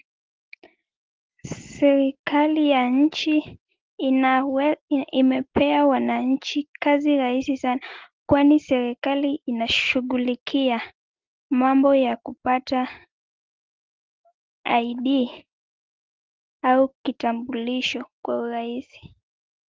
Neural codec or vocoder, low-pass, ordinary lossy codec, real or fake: none; 7.2 kHz; Opus, 16 kbps; real